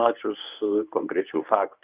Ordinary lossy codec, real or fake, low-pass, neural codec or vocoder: Opus, 24 kbps; fake; 3.6 kHz; codec, 24 kHz, 0.9 kbps, WavTokenizer, medium speech release version 1